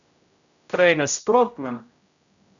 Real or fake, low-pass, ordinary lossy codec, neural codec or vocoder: fake; 7.2 kHz; none; codec, 16 kHz, 0.5 kbps, X-Codec, HuBERT features, trained on general audio